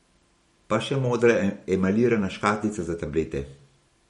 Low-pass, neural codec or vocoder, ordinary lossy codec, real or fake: 19.8 kHz; vocoder, 44.1 kHz, 128 mel bands every 512 samples, BigVGAN v2; MP3, 48 kbps; fake